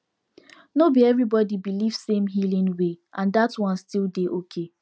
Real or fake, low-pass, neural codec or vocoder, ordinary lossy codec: real; none; none; none